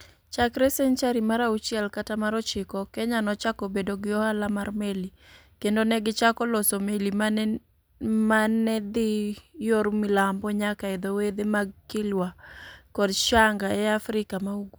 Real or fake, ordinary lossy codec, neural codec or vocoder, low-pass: real; none; none; none